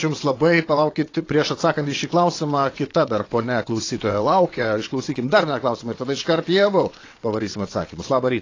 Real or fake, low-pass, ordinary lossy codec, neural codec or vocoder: fake; 7.2 kHz; AAC, 32 kbps; vocoder, 22.05 kHz, 80 mel bands, WaveNeXt